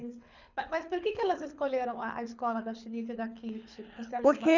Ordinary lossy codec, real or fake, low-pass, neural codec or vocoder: none; fake; 7.2 kHz; codec, 24 kHz, 6 kbps, HILCodec